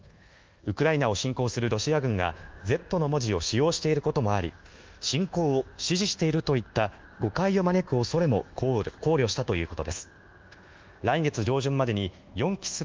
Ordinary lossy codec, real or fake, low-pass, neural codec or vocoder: Opus, 32 kbps; fake; 7.2 kHz; codec, 24 kHz, 1.2 kbps, DualCodec